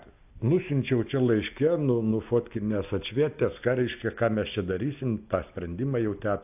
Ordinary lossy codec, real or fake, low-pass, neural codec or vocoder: AAC, 32 kbps; real; 3.6 kHz; none